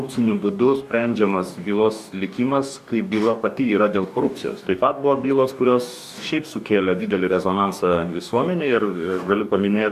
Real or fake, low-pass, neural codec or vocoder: fake; 14.4 kHz; codec, 44.1 kHz, 2.6 kbps, DAC